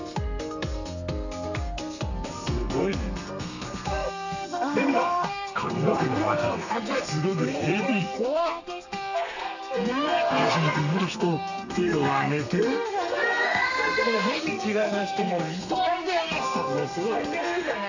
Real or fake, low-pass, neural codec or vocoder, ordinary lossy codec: fake; 7.2 kHz; codec, 32 kHz, 1.9 kbps, SNAC; none